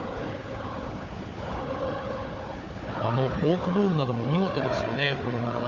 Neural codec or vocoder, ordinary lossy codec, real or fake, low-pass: codec, 16 kHz, 4 kbps, FunCodec, trained on Chinese and English, 50 frames a second; MP3, 48 kbps; fake; 7.2 kHz